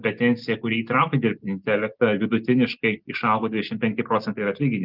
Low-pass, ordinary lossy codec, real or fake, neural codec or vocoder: 5.4 kHz; Opus, 32 kbps; real; none